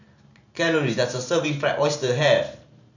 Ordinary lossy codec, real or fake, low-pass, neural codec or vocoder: none; fake; 7.2 kHz; vocoder, 44.1 kHz, 128 mel bands every 512 samples, BigVGAN v2